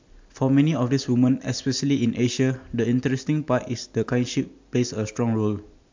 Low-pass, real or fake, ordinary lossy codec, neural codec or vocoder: 7.2 kHz; real; MP3, 64 kbps; none